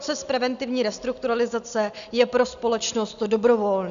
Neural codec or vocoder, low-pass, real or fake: none; 7.2 kHz; real